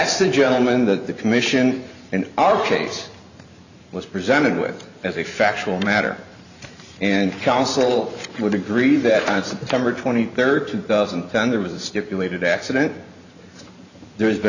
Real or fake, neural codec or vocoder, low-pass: fake; vocoder, 44.1 kHz, 128 mel bands every 512 samples, BigVGAN v2; 7.2 kHz